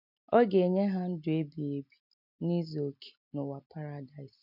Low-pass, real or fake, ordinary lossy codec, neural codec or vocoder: 5.4 kHz; real; none; none